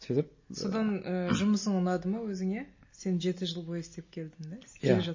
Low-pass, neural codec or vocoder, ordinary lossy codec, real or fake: 7.2 kHz; none; MP3, 32 kbps; real